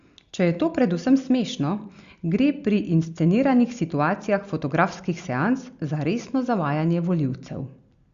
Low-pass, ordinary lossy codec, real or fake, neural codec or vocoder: 7.2 kHz; Opus, 64 kbps; real; none